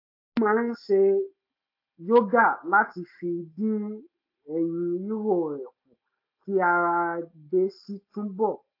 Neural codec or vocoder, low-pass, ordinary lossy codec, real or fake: none; 5.4 kHz; AAC, 32 kbps; real